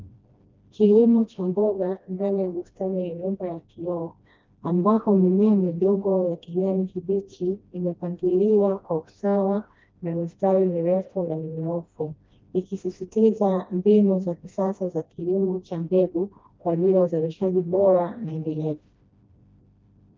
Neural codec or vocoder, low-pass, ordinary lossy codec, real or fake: codec, 16 kHz, 1 kbps, FreqCodec, smaller model; 7.2 kHz; Opus, 24 kbps; fake